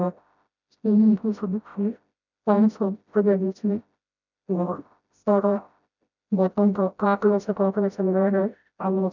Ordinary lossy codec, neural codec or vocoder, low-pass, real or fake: none; codec, 16 kHz, 0.5 kbps, FreqCodec, smaller model; 7.2 kHz; fake